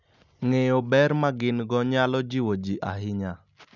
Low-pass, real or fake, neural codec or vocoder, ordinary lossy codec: 7.2 kHz; real; none; none